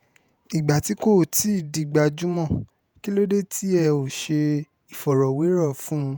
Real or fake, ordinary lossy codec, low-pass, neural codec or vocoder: fake; none; none; vocoder, 48 kHz, 128 mel bands, Vocos